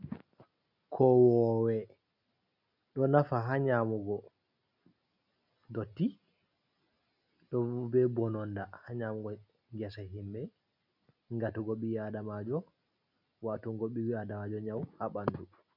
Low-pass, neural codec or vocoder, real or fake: 5.4 kHz; none; real